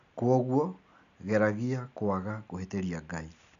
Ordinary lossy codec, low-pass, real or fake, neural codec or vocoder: none; 7.2 kHz; real; none